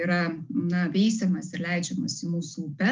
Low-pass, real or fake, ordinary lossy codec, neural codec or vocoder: 7.2 kHz; real; Opus, 32 kbps; none